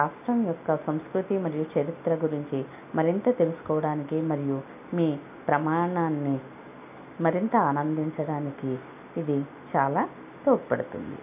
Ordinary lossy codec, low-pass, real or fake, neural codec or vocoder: none; 3.6 kHz; real; none